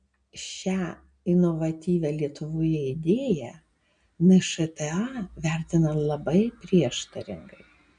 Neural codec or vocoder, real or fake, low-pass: none; real; 9.9 kHz